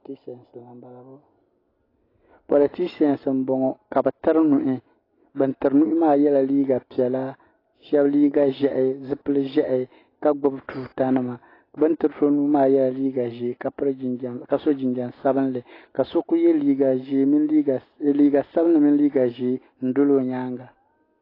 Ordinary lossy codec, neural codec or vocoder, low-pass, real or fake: AAC, 24 kbps; none; 5.4 kHz; real